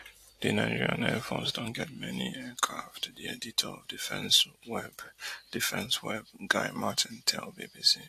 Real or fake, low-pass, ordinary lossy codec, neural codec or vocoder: real; 14.4 kHz; AAC, 64 kbps; none